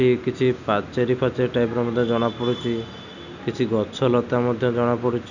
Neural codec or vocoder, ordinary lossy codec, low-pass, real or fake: none; none; 7.2 kHz; real